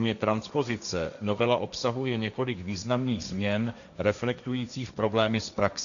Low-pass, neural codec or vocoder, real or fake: 7.2 kHz; codec, 16 kHz, 1.1 kbps, Voila-Tokenizer; fake